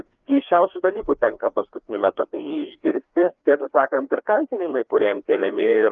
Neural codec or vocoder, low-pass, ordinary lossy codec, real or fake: codec, 16 kHz, 2 kbps, FreqCodec, larger model; 7.2 kHz; Opus, 32 kbps; fake